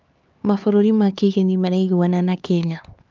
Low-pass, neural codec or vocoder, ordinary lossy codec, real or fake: 7.2 kHz; codec, 16 kHz, 4 kbps, X-Codec, HuBERT features, trained on LibriSpeech; Opus, 24 kbps; fake